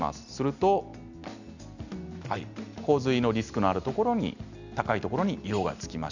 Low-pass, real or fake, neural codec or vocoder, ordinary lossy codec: 7.2 kHz; real; none; none